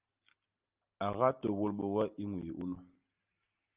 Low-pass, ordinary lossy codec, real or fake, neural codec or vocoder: 3.6 kHz; Opus, 24 kbps; real; none